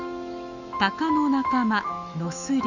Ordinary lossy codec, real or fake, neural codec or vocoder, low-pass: none; fake; autoencoder, 48 kHz, 128 numbers a frame, DAC-VAE, trained on Japanese speech; 7.2 kHz